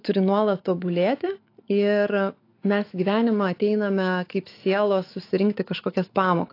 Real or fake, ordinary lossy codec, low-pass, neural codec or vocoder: fake; AAC, 32 kbps; 5.4 kHz; autoencoder, 48 kHz, 128 numbers a frame, DAC-VAE, trained on Japanese speech